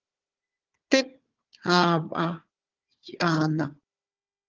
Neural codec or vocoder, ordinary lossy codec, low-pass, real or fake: codec, 16 kHz, 4 kbps, FunCodec, trained on Chinese and English, 50 frames a second; Opus, 32 kbps; 7.2 kHz; fake